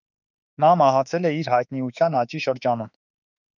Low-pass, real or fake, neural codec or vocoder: 7.2 kHz; fake; autoencoder, 48 kHz, 32 numbers a frame, DAC-VAE, trained on Japanese speech